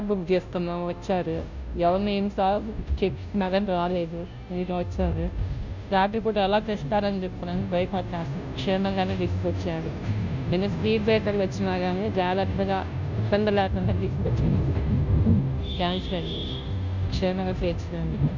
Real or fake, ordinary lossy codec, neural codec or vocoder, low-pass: fake; none; codec, 16 kHz, 0.5 kbps, FunCodec, trained on Chinese and English, 25 frames a second; 7.2 kHz